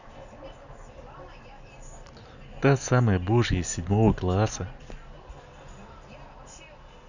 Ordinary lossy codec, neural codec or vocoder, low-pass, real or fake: none; none; 7.2 kHz; real